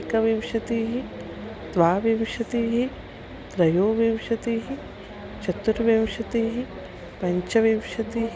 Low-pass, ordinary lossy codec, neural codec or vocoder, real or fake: none; none; none; real